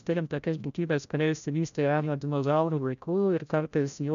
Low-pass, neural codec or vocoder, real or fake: 7.2 kHz; codec, 16 kHz, 0.5 kbps, FreqCodec, larger model; fake